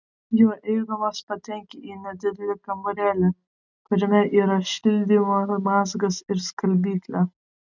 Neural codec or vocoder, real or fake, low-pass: none; real; 7.2 kHz